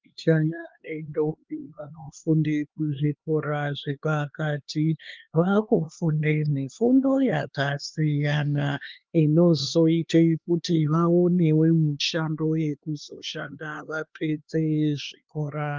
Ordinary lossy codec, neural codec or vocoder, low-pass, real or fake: Opus, 32 kbps; codec, 16 kHz, 2 kbps, X-Codec, HuBERT features, trained on LibriSpeech; 7.2 kHz; fake